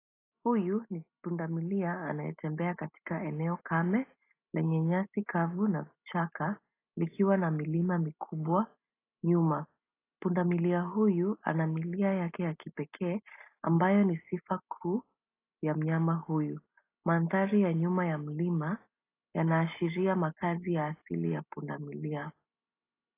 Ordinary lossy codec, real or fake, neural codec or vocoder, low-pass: AAC, 24 kbps; real; none; 3.6 kHz